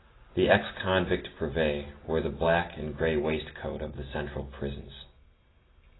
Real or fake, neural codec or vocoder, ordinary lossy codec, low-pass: real; none; AAC, 16 kbps; 7.2 kHz